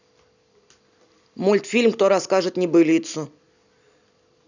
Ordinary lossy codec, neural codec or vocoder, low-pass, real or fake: none; none; 7.2 kHz; real